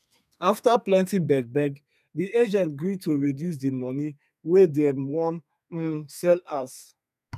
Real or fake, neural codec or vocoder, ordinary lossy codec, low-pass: fake; codec, 32 kHz, 1.9 kbps, SNAC; none; 14.4 kHz